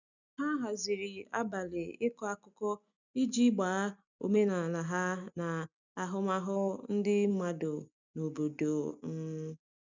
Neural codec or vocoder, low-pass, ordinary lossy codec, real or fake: none; 7.2 kHz; none; real